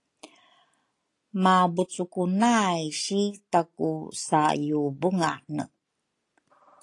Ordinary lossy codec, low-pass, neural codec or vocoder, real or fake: AAC, 48 kbps; 10.8 kHz; none; real